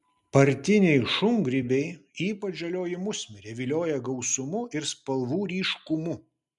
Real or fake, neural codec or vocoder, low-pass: real; none; 10.8 kHz